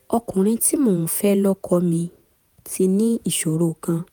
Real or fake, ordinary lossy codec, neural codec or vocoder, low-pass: fake; none; vocoder, 48 kHz, 128 mel bands, Vocos; none